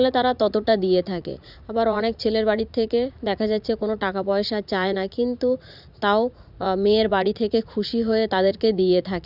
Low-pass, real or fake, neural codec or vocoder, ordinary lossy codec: 5.4 kHz; fake; vocoder, 44.1 kHz, 80 mel bands, Vocos; none